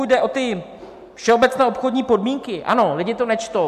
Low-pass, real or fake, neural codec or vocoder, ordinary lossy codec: 14.4 kHz; real; none; MP3, 96 kbps